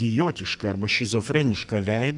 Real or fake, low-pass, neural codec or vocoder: fake; 10.8 kHz; codec, 44.1 kHz, 2.6 kbps, SNAC